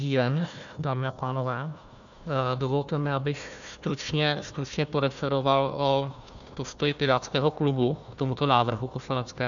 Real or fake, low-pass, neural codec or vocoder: fake; 7.2 kHz; codec, 16 kHz, 1 kbps, FunCodec, trained on Chinese and English, 50 frames a second